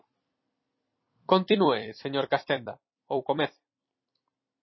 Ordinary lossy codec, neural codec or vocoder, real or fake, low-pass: MP3, 24 kbps; vocoder, 44.1 kHz, 128 mel bands every 256 samples, BigVGAN v2; fake; 7.2 kHz